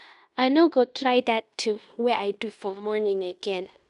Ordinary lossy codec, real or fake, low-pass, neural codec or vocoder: none; fake; 10.8 kHz; codec, 16 kHz in and 24 kHz out, 0.9 kbps, LongCat-Audio-Codec, fine tuned four codebook decoder